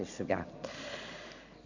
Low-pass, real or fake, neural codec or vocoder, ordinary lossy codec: 7.2 kHz; real; none; none